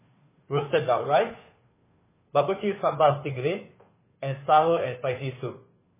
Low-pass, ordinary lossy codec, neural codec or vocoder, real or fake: 3.6 kHz; MP3, 16 kbps; codec, 16 kHz, 2 kbps, FunCodec, trained on Chinese and English, 25 frames a second; fake